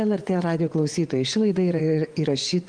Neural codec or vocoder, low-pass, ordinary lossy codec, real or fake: vocoder, 24 kHz, 100 mel bands, Vocos; 9.9 kHz; Opus, 24 kbps; fake